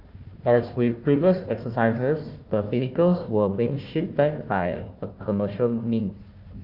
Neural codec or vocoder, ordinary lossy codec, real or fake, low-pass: codec, 16 kHz, 1 kbps, FunCodec, trained on Chinese and English, 50 frames a second; Opus, 24 kbps; fake; 5.4 kHz